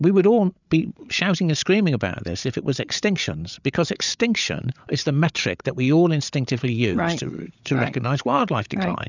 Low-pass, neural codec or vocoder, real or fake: 7.2 kHz; codec, 16 kHz, 8 kbps, FreqCodec, larger model; fake